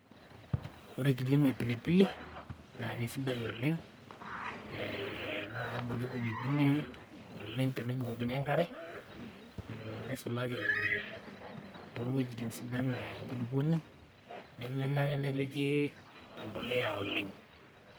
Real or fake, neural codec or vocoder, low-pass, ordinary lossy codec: fake; codec, 44.1 kHz, 1.7 kbps, Pupu-Codec; none; none